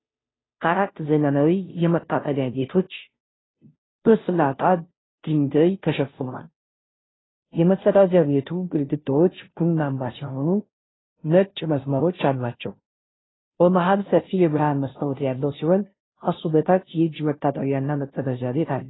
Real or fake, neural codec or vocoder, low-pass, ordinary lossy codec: fake; codec, 16 kHz, 0.5 kbps, FunCodec, trained on Chinese and English, 25 frames a second; 7.2 kHz; AAC, 16 kbps